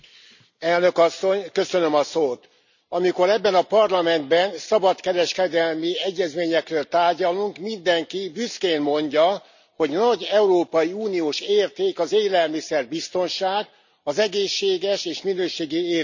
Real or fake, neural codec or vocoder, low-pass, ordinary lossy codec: real; none; 7.2 kHz; none